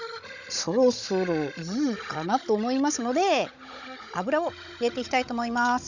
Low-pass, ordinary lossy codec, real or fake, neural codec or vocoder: 7.2 kHz; none; fake; codec, 16 kHz, 16 kbps, FunCodec, trained on Chinese and English, 50 frames a second